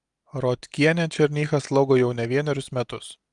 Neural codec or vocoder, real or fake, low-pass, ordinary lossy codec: none; real; 10.8 kHz; Opus, 32 kbps